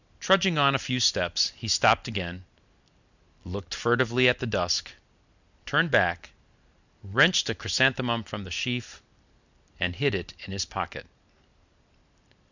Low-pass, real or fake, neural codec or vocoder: 7.2 kHz; real; none